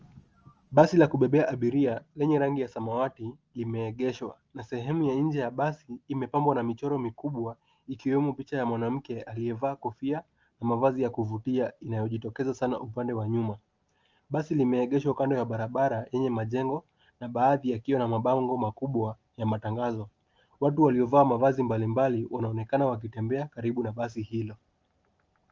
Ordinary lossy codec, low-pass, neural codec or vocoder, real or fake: Opus, 24 kbps; 7.2 kHz; none; real